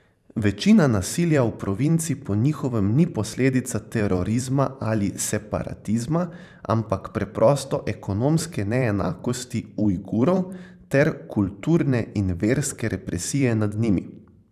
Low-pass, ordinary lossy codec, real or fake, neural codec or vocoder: 14.4 kHz; none; fake; vocoder, 44.1 kHz, 128 mel bands every 512 samples, BigVGAN v2